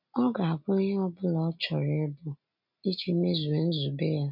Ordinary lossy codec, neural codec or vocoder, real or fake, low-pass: MP3, 48 kbps; none; real; 5.4 kHz